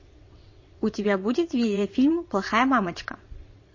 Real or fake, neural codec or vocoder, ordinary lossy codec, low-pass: fake; vocoder, 22.05 kHz, 80 mel bands, WaveNeXt; MP3, 32 kbps; 7.2 kHz